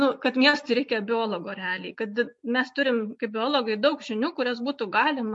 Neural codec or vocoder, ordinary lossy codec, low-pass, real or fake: none; MP3, 48 kbps; 10.8 kHz; real